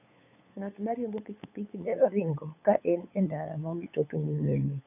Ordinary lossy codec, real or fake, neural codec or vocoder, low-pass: none; fake; codec, 16 kHz, 8 kbps, FunCodec, trained on LibriTTS, 25 frames a second; 3.6 kHz